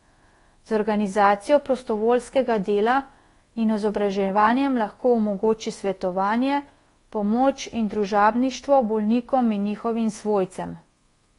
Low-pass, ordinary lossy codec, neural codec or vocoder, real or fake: 10.8 kHz; AAC, 32 kbps; codec, 24 kHz, 1.2 kbps, DualCodec; fake